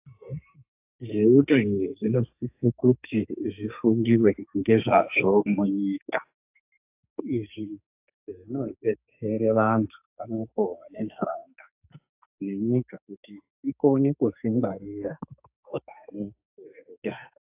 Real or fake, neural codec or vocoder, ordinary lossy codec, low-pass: fake; codec, 44.1 kHz, 2.6 kbps, SNAC; AAC, 32 kbps; 3.6 kHz